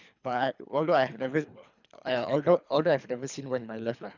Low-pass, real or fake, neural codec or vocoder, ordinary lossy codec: 7.2 kHz; fake; codec, 24 kHz, 3 kbps, HILCodec; none